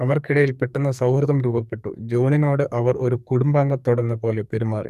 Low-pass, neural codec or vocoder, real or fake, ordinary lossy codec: 14.4 kHz; codec, 44.1 kHz, 2.6 kbps, SNAC; fake; none